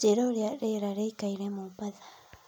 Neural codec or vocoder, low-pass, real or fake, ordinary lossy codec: none; none; real; none